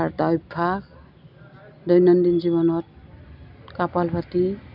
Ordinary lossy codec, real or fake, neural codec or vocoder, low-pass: none; real; none; 5.4 kHz